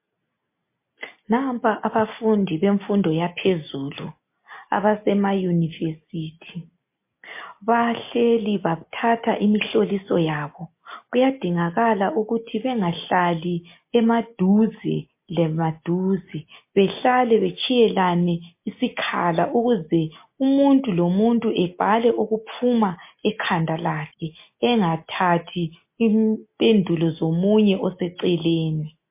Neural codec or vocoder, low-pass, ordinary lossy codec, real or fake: none; 3.6 kHz; MP3, 24 kbps; real